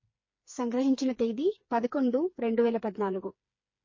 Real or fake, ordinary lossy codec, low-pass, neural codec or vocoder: fake; MP3, 32 kbps; 7.2 kHz; codec, 16 kHz, 4 kbps, FreqCodec, smaller model